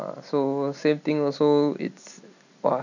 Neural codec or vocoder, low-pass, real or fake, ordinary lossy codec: none; 7.2 kHz; real; none